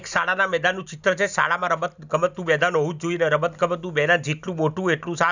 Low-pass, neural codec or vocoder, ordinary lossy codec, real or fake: 7.2 kHz; none; none; real